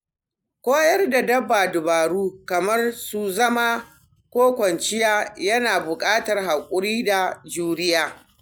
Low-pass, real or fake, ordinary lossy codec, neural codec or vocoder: none; real; none; none